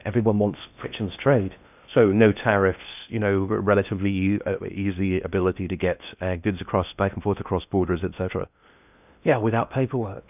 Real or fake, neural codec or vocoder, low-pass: fake; codec, 16 kHz in and 24 kHz out, 0.6 kbps, FocalCodec, streaming, 4096 codes; 3.6 kHz